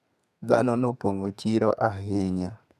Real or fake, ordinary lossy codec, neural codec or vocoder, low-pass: fake; none; codec, 32 kHz, 1.9 kbps, SNAC; 14.4 kHz